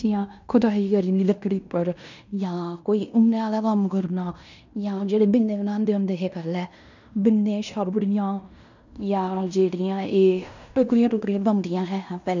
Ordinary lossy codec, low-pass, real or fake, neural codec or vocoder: none; 7.2 kHz; fake; codec, 16 kHz in and 24 kHz out, 0.9 kbps, LongCat-Audio-Codec, fine tuned four codebook decoder